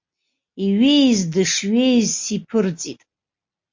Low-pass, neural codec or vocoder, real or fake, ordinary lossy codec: 7.2 kHz; none; real; MP3, 48 kbps